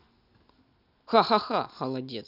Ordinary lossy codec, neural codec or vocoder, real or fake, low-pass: none; none; real; 5.4 kHz